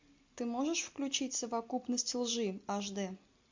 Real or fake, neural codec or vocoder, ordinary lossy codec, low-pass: real; none; MP3, 64 kbps; 7.2 kHz